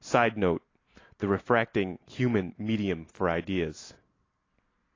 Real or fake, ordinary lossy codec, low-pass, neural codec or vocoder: real; AAC, 32 kbps; 7.2 kHz; none